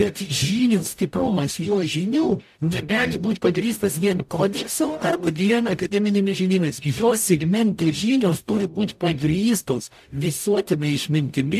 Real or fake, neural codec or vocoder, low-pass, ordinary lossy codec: fake; codec, 44.1 kHz, 0.9 kbps, DAC; 14.4 kHz; AAC, 96 kbps